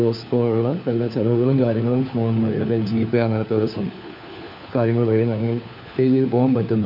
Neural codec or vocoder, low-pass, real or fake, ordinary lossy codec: codec, 16 kHz, 4 kbps, FunCodec, trained on LibriTTS, 50 frames a second; 5.4 kHz; fake; none